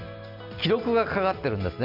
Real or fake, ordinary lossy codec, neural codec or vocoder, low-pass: real; none; none; 5.4 kHz